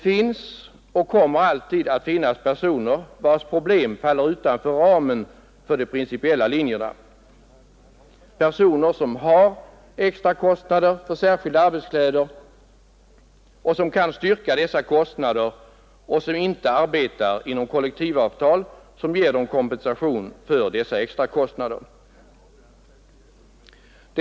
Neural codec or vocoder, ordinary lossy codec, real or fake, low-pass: none; none; real; none